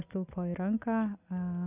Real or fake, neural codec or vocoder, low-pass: real; none; 3.6 kHz